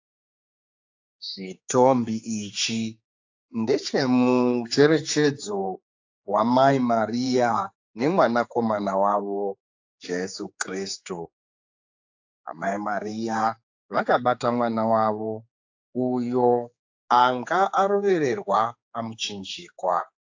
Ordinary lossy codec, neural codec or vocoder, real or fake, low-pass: AAC, 48 kbps; codec, 16 kHz, 4 kbps, X-Codec, HuBERT features, trained on general audio; fake; 7.2 kHz